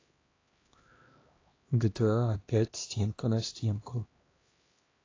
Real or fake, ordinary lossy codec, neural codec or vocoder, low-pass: fake; AAC, 32 kbps; codec, 16 kHz, 1 kbps, X-Codec, HuBERT features, trained on LibriSpeech; 7.2 kHz